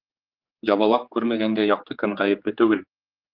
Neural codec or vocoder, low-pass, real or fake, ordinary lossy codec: codec, 16 kHz, 4 kbps, X-Codec, HuBERT features, trained on general audio; 5.4 kHz; fake; Opus, 32 kbps